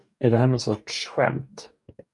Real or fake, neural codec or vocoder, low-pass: fake; codec, 44.1 kHz, 2.6 kbps, DAC; 10.8 kHz